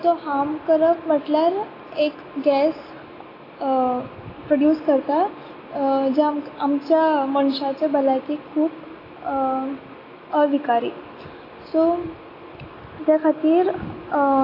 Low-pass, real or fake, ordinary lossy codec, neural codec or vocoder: 5.4 kHz; real; AAC, 24 kbps; none